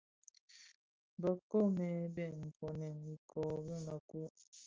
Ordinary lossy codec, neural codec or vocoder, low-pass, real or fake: Opus, 32 kbps; none; 7.2 kHz; real